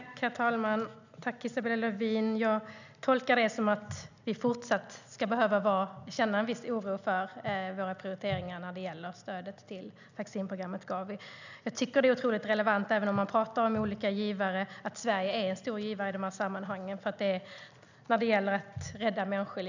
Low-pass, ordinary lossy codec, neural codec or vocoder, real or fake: 7.2 kHz; none; none; real